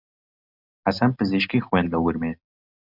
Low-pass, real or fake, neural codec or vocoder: 5.4 kHz; real; none